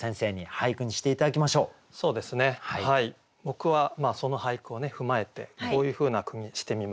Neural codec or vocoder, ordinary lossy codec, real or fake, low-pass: none; none; real; none